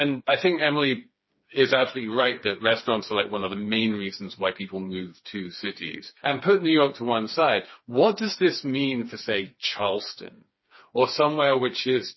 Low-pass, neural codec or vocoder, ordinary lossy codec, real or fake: 7.2 kHz; codec, 16 kHz, 4 kbps, FreqCodec, smaller model; MP3, 24 kbps; fake